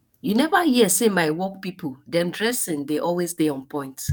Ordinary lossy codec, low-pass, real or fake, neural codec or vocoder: none; 19.8 kHz; fake; codec, 44.1 kHz, 7.8 kbps, DAC